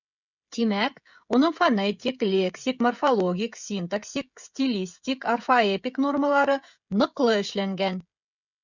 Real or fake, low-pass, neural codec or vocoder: fake; 7.2 kHz; codec, 16 kHz, 16 kbps, FreqCodec, smaller model